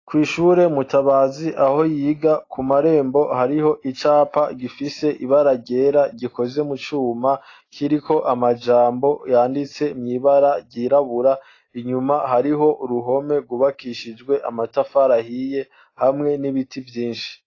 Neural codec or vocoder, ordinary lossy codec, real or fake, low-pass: none; AAC, 32 kbps; real; 7.2 kHz